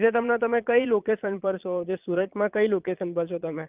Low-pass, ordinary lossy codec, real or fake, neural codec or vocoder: 3.6 kHz; Opus, 32 kbps; fake; vocoder, 22.05 kHz, 80 mel bands, Vocos